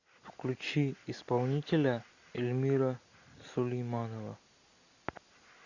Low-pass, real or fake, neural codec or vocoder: 7.2 kHz; real; none